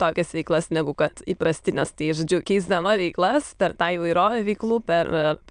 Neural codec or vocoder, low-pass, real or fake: autoencoder, 22.05 kHz, a latent of 192 numbers a frame, VITS, trained on many speakers; 9.9 kHz; fake